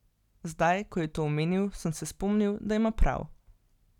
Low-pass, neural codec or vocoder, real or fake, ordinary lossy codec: 19.8 kHz; none; real; none